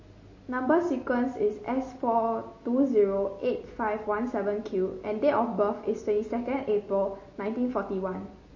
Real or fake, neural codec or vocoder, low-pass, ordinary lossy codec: real; none; 7.2 kHz; MP3, 32 kbps